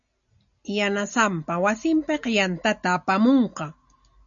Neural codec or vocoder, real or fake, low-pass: none; real; 7.2 kHz